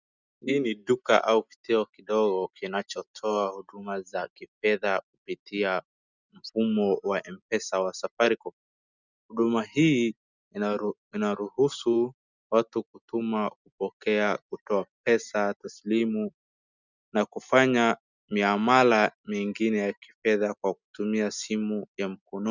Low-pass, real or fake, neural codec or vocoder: 7.2 kHz; real; none